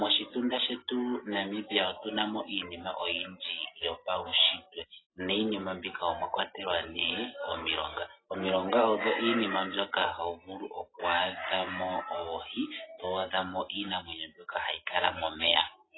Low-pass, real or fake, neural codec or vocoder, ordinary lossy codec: 7.2 kHz; real; none; AAC, 16 kbps